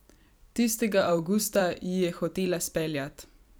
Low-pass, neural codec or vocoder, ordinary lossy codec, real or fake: none; vocoder, 44.1 kHz, 128 mel bands every 256 samples, BigVGAN v2; none; fake